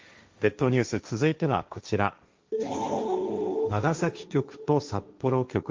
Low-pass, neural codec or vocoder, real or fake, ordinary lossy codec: 7.2 kHz; codec, 16 kHz, 1.1 kbps, Voila-Tokenizer; fake; Opus, 32 kbps